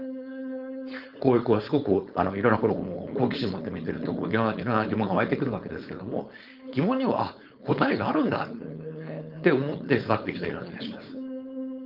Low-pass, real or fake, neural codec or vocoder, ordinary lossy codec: 5.4 kHz; fake; codec, 16 kHz, 4.8 kbps, FACodec; Opus, 24 kbps